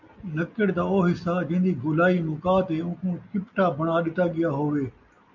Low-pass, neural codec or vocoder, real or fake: 7.2 kHz; none; real